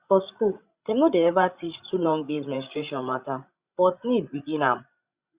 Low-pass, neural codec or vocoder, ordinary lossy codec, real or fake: 3.6 kHz; codec, 16 kHz, 16 kbps, FreqCodec, larger model; Opus, 64 kbps; fake